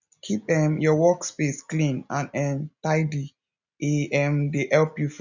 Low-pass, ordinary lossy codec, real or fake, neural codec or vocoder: 7.2 kHz; none; real; none